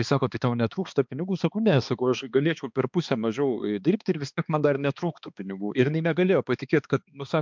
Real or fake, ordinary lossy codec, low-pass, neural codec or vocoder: fake; MP3, 64 kbps; 7.2 kHz; codec, 16 kHz, 2 kbps, X-Codec, HuBERT features, trained on balanced general audio